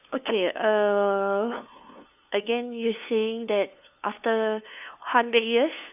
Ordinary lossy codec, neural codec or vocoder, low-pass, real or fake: none; codec, 16 kHz, 2 kbps, FunCodec, trained on LibriTTS, 25 frames a second; 3.6 kHz; fake